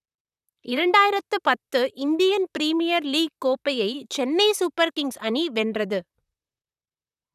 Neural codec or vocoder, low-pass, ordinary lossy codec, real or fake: vocoder, 44.1 kHz, 128 mel bands, Pupu-Vocoder; 14.4 kHz; none; fake